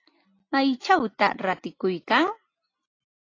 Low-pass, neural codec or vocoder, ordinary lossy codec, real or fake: 7.2 kHz; none; AAC, 32 kbps; real